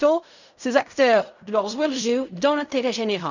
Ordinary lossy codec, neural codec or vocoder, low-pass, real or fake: none; codec, 16 kHz in and 24 kHz out, 0.4 kbps, LongCat-Audio-Codec, fine tuned four codebook decoder; 7.2 kHz; fake